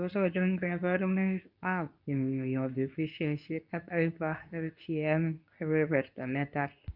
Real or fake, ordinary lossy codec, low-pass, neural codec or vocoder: fake; none; 5.4 kHz; codec, 24 kHz, 0.9 kbps, WavTokenizer, medium speech release version 2